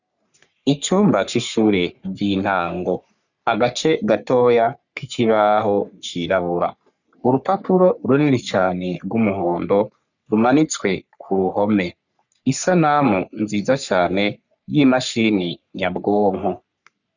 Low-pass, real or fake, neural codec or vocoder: 7.2 kHz; fake; codec, 44.1 kHz, 3.4 kbps, Pupu-Codec